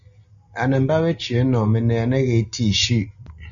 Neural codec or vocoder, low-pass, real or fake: none; 7.2 kHz; real